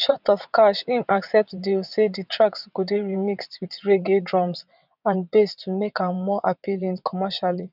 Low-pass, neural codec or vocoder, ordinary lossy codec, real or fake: 5.4 kHz; vocoder, 22.05 kHz, 80 mel bands, WaveNeXt; none; fake